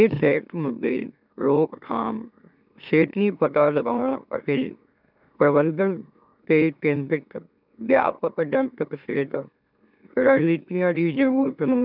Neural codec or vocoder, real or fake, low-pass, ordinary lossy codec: autoencoder, 44.1 kHz, a latent of 192 numbers a frame, MeloTTS; fake; 5.4 kHz; none